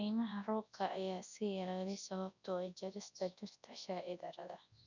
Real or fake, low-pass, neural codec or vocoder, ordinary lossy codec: fake; 7.2 kHz; codec, 24 kHz, 0.9 kbps, WavTokenizer, large speech release; none